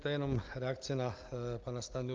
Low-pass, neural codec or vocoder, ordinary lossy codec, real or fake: 7.2 kHz; none; Opus, 32 kbps; real